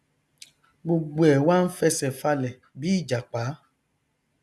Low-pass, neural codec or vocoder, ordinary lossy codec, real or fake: none; none; none; real